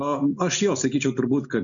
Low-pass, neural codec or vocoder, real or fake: 7.2 kHz; none; real